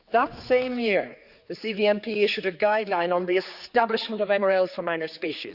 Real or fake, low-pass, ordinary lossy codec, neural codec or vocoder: fake; 5.4 kHz; Opus, 64 kbps; codec, 16 kHz, 4 kbps, X-Codec, HuBERT features, trained on general audio